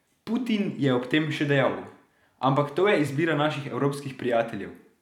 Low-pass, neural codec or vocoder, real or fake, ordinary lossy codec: 19.8 kHz; vocoder, 44.1 kHz, 128 mel bands every 256 samples, BigVGAN v2; fake; none